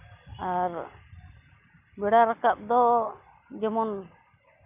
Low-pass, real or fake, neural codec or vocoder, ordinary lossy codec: 3.6 kHz; real; none; none